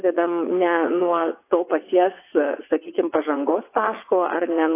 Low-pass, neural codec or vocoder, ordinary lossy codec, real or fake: 3.6 kHz; vocoder, 22.05 kHz, 80 mel bands, WaveNeXt; AAC, 24 kbps; fake